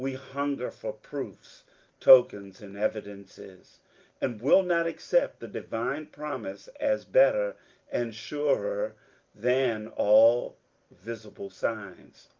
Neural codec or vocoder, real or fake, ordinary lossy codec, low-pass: none; real; Opus, 24 kbps; 7.2 kHz